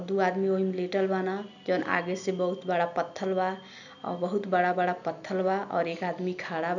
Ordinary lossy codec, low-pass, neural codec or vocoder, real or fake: none; 7.2 kHz; none; real